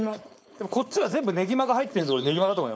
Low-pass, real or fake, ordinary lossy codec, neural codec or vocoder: none; fake; none; codec, 16 kHz, 4.8 kbps, FACodec